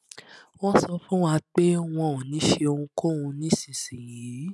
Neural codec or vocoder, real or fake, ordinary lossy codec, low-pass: none; real; none; none